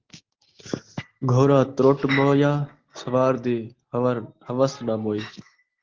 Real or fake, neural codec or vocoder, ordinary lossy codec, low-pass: real; none; Opus, 16 kbps; 7.2 kHz